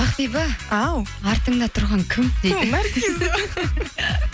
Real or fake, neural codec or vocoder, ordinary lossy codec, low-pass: real; none; none; none